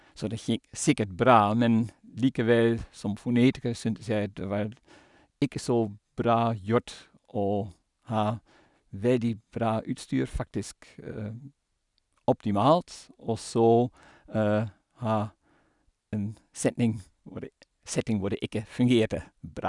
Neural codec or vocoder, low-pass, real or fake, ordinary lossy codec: none; 10.8 kHz; real; none